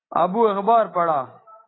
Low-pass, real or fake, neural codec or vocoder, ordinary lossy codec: 7.2 kHz; real; none; AAC, 16 kbps